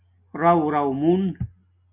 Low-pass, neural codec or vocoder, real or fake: 3.6 kHz; none; real